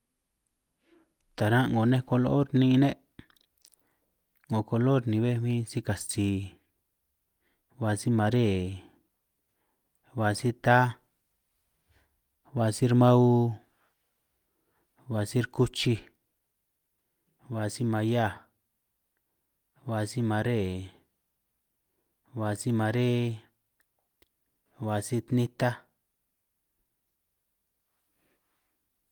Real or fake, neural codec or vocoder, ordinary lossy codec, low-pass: real; none; Opus, 32 kbps; 19.8 kHz